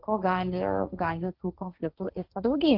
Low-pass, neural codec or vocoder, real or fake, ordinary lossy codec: 5.4 kHz; codec, 16 kHz, 1.1 kbps, Voila-Tokenizer; fake; Opus, 32 kbps